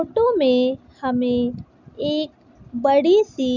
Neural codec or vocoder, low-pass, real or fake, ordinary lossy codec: none; 7.2 kHz; real; none